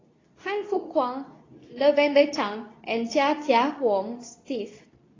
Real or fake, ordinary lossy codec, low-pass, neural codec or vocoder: fake; AAC, 32 kbps; 7.2 kHz; codec, 24 kHz, 0.9 kbps, WavTokenizer, medium speech release version 2